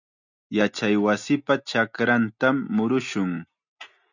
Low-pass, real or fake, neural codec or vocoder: 7.2 kHz; real; none